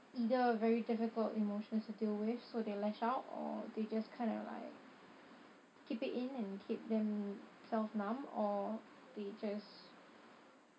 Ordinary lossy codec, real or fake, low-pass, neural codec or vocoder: none; real; none; none